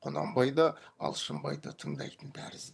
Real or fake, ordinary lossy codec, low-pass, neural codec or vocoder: fake; none; none; vocoder, 22.05 kHz, 80 mel bands, HiFi-GAN